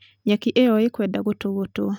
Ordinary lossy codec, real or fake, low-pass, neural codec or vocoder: none; real; 19.8 kHz; none